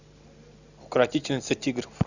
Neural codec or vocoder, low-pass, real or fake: none; 7.2 kHz; real